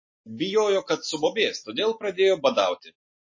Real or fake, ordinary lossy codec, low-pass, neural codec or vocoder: real; MP3, 32 kbps; 7.2 kHz; none